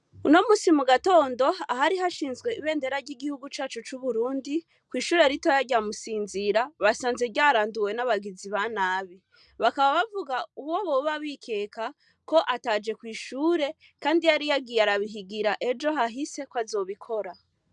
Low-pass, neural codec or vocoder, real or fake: 10.8 kHz; none; real